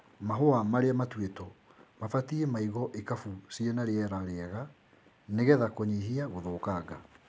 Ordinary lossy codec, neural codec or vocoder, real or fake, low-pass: none; none; real; none